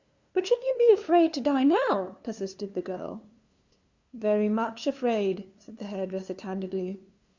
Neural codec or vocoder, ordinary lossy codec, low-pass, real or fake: codec, 16 kHz, 2 kbps, FunCodec, trained on LibriTTS, 25 frames a second; Opus, 64 kbps; 7.2 kHz; fake